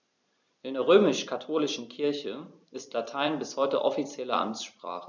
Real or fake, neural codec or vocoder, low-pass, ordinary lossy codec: fake; vocoder, 44.1 kHz, 128 mel bands every 256 samples, BigVGAN v2; 7.2 kHz; none